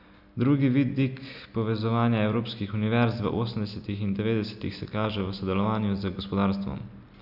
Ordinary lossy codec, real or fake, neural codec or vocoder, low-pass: none; real; none; 5.4 kHz